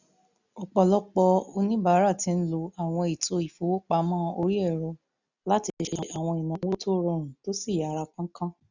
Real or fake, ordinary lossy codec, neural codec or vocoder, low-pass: real; none; none; 7.2 kHz